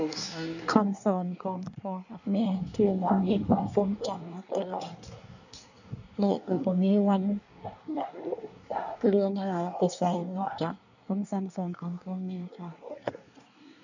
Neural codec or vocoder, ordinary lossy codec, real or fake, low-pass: codec, 24 kHz, 1 kbps, SNAC; none; fake; 7.2 kHz